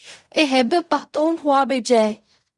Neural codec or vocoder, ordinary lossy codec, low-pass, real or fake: codec, 16 kHz in and 24 kHz out, 0.4 kbps, LongCat-Audio-Codec, fine tuned four codebook decoder; Opus, 64 kbps; 10.8 kHz; fake